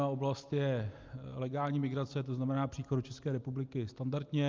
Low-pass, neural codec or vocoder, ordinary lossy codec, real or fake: 7.2 kHz; none; Opus, 32 kbps; real